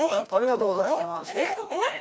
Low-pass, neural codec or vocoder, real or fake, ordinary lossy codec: none; codec, 16 kHz, 1 kbps, FreqCodec, larger model; fake; none